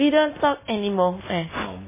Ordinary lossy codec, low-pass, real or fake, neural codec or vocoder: MP3, 16 kbps; 3.6 kHz; real; none